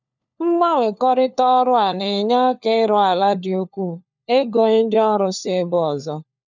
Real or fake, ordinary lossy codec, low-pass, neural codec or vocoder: fake; none; 7.2 kHz; codec, 16 kHz, 4 kbps, FunCodec, trained on LibriTTS, 50 frames a second